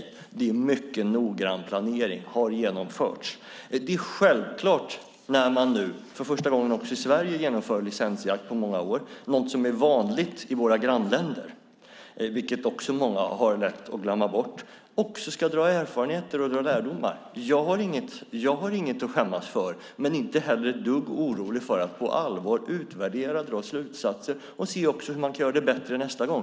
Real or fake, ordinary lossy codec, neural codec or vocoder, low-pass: real; none; none; none